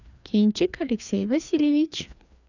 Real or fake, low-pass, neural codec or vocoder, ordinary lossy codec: fake; 7.2 kHz; codec, 16 kHz, 2 kbps, FreqCodec, larger model; none